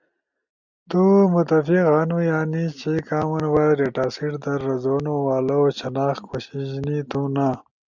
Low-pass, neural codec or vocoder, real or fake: 7.2 kHz; none; real